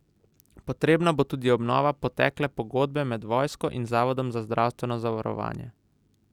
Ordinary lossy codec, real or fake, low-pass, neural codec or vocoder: Opus, 64 kbps; real; 19.8 kHz; none